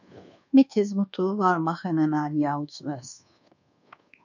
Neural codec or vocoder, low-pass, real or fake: codec, 24 kHz, 1.2 kbps, DualCodec; 7.2 kHz; fake